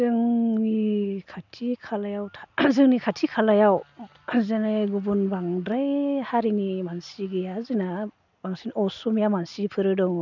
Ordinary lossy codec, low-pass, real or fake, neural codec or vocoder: none; 7.2 kHz; real; none